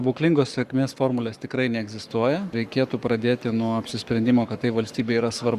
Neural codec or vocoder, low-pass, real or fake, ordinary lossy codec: codec, 44.1 kHz, 7.8 kbps, Pupu-Codec; 14.4 kHz; fake; Opus, 64 kbps